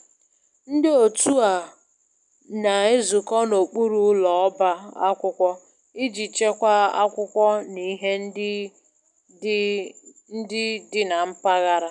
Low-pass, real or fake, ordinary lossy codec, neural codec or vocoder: 10.8 kHz; real; none; none